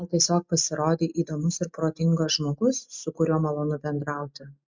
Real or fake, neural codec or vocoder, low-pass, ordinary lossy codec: real; none; 7.2 kHz; MP3, 64 kbps